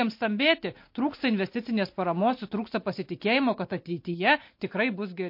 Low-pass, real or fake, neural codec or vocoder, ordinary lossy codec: 5.4 kHz; real; none; MP3, 32 kbps